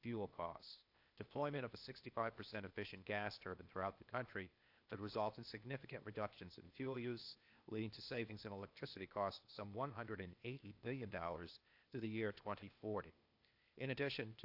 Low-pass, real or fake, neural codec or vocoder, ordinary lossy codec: 5.4 kHz; fake; codec, 16 kHz, 0.8 kbps, ZipCodec; AAC, 48 kbps